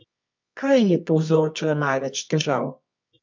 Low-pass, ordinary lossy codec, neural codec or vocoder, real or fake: 7.2 kHz; MP3, 64 kbps; codec, 24 kHz, 0.9 kbps, WavTokenizer, medium music audio release; fake